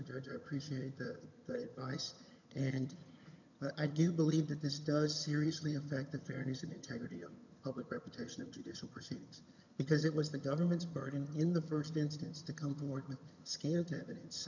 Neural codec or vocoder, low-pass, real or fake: vocoder, 22.05 kHz, 80 mel bands, HiFi-GAN; 7.2 kHz; fake